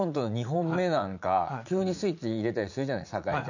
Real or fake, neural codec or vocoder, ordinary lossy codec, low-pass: fake; vocoder, 44.1 kHz, 80 mel bands, Vocos; none; 7.2 kHz